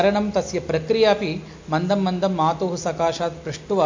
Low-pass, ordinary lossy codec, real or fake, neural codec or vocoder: 7.2 kHz; MP3, 48 kbps; real; none